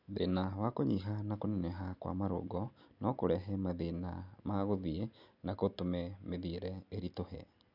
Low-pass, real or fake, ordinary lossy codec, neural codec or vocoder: 5.4 kHz; real; none; none